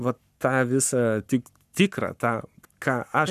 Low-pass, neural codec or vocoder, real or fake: 14.4 kHz; codec, 44.1 kHz, 7.8 kbps, Pupu-Codec; fake